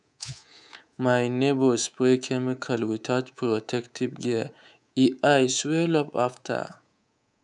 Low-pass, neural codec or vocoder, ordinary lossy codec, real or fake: 10.8 kHz; codec, 24 kHz, 3.1 kbps, DualCodec; none; fake